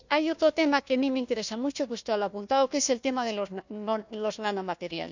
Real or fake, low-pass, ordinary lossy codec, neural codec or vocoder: fake; 7.2 kHz; none; codec, 16 kHz, 1 kbps, FunCodec, trained on Chinese and English, 50 frames a second